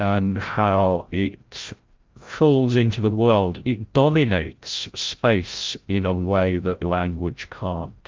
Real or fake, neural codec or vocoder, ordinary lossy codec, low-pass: fake; codec, 16 kHz, 0.5 kbps, FreqCodec, larger model; Opus, 24 kbps; 7.2 kHz